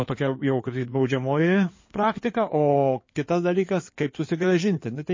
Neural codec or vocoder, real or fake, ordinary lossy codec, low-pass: codec, 16 kHz in and 24 kHz out, 2.2 kbps, FireRedTTS-2 codec; fake; MP3, 32 kbps; 7.2 kHz